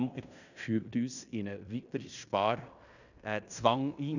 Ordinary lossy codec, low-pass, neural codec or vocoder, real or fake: none; 7.2 kHz; codec, 16 kHz in and 24 kHz out, 0.9 kbps, LongCat-Audio-Codec, fine tuned four codebook decoder; fake